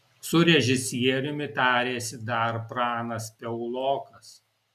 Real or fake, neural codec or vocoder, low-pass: real; none; 14.4 kHz